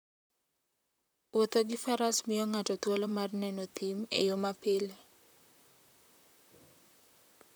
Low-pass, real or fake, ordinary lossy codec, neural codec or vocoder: none; fake; none; vocoder, 44.1 kHz, 128 mel bands, Pupu-Vocoder